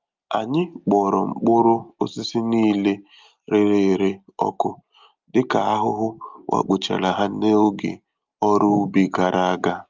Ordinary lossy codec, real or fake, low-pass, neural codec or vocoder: Opus, 32 kbps; real; 7.2 kHz; none